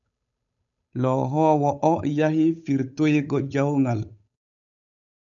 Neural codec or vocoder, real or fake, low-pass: codec, 16 kHz, 8 kbps, FunCodec, trained on Chinese and English, 25 frames a second; fake; 7.2 kHz